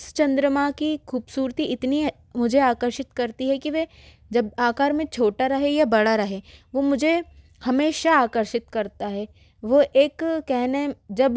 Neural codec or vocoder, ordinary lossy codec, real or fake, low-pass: none; none; real; none